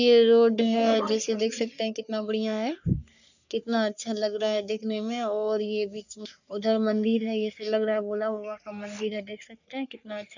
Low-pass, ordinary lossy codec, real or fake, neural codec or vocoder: 7.2 kHz; none; fake; codec, 44.1 kHz, 3.4 kbps, Pupu-Codec